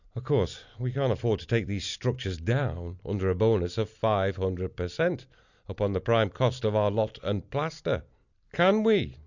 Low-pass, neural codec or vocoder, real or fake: 7.2 kHz; none; real